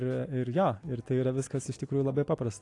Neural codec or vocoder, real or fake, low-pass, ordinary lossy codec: none; real; 10.8 kHz; AAC, 48 kbps